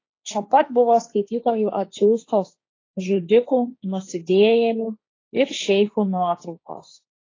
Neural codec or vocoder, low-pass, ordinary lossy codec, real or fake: codec, 16 kHz, 1.1 kbps, Voila-Tokenizer; 7.2 kHz; AAC, 32 kbps; fake